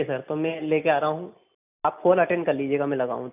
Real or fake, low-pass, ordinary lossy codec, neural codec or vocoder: real; 3.6 kHz; none; none